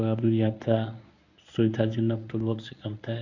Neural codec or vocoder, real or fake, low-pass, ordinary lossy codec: codec, 24 kHz, 0.9 kbps, WavTokenizer, medium speech release version 1; fake; 7.2 kHz; none